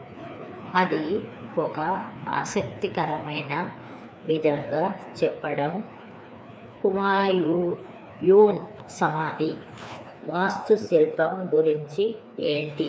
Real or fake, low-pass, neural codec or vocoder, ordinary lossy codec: fake; none; codec, 16 kHz, 2 kbps, FreqCodec, larger model; none